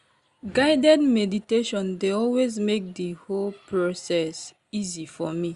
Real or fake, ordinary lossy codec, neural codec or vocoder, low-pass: real; Opus, 64 kbps; none; 10.8 kHz